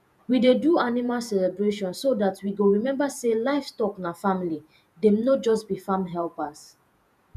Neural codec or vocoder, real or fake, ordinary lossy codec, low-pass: vocoder, 48 kHz, 128 mel bands, Vocos; fake; none; 14.4 kHz